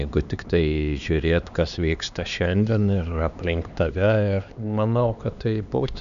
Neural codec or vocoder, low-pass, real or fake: codec, 16 kHz, 2 kbps, X-Codec, HuBERT features, trained on LibriSpeech; 7.2 kHz; fake